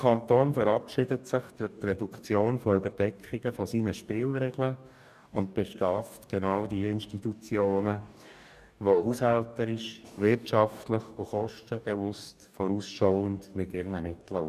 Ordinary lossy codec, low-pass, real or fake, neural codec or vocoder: none; 14.4 kHz; fake; codec, 44.1 kHz, 2.6 kbps, DAC